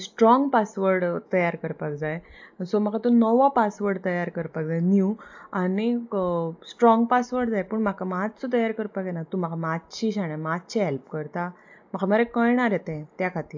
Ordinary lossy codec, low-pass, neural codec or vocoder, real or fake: AAC, 48 kbps; 7.2 kHz; none; real